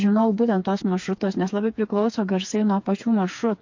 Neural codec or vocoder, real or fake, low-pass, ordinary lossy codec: codec, 16 kHz, 4 kbps, FreqCodec, smaller model; fake; 7.2 kHz; MP3, 48 kbps